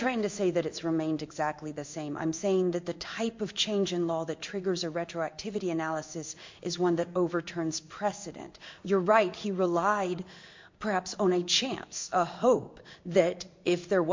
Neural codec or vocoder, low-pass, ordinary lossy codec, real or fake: codec, 16 kHz in and 24 kHz out, 1 kbps, XY-Tokenizer; 7.2 kHz; MP3, 48 kbps; fake